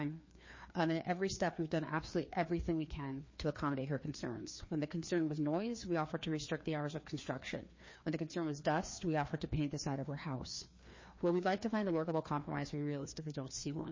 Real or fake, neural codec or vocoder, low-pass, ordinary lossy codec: fake; codec, 16 kHz, 2 kbps, FreqCodec, larger model; 7.2 kHz; MP3, 32 kbps